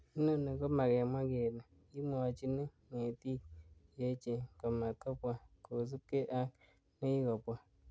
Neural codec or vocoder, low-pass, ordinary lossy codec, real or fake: none; none; none; real